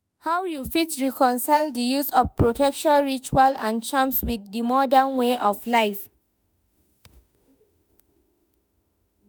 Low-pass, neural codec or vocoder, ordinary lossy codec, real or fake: none; autoencoder, 48 kHz, 32 numbers a frame, DAC-VAE, trained on Japanese speech; none; fake